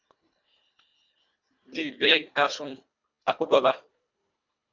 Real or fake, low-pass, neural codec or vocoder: fake; 7.2 kHz; codec, 24 kHz, 1.5 kbps, HILCodec